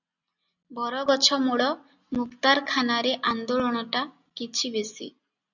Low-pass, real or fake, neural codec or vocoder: 7.2 kHz; real; none